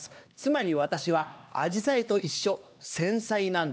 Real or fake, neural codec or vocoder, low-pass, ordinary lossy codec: fake; codec, 16 kHz, 2 kbps, X-Codec, HuBERT features, trained on LibriSpeech; none; none